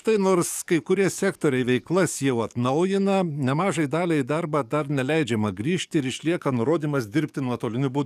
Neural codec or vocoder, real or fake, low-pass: codec, 44.1 kHz, 7.8 kbps, DAC; fake; 14.4 kHz